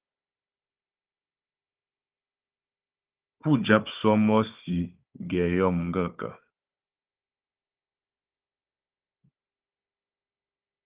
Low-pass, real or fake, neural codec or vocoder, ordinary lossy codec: 3.6 kHz; fake; codec, 16 kHz, 4 kbps, FunCodec, trained on Chinese and English, 50 frames a second; Opus, 24 kbps